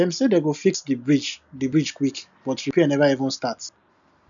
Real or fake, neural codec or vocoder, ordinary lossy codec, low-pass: real; none; none; 7.2 kHz